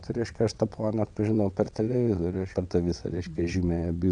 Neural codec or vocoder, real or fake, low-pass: vocoder, 22.05 kHz, 80 mel bands, WaveNeXt; fake; 9.9 kHz